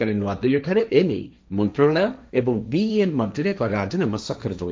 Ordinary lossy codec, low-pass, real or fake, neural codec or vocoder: none; none; fake; codec, 16 kHz, 1.1 kbps, Voila-Tokenizer